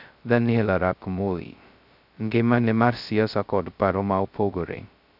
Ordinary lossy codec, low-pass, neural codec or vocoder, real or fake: none; 5.4 kHz; codec, 16 kHz, 0.2 kbps, FocalCodec; fake